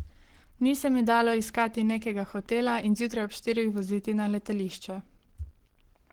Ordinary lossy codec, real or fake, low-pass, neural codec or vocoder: Opus, 16 kbps; fake; 19.8 kHz; codec, 44.1 kHz, 7.8 kbps, Pupu-Codec